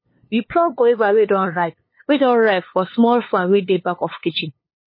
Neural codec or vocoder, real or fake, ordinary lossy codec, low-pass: codec, 16 kHz, 2 kbps, FunCodec, trained on LibriTTS, 25 frames a second; fake; MP3, 24 kbps; 5.4 kHz